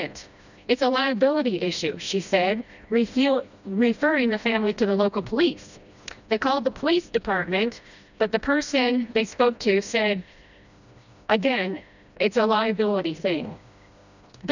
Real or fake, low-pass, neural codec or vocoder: fake; 7.2 kHz; codec, 16 kHz, 1 kbps, FreqCodec, smaller model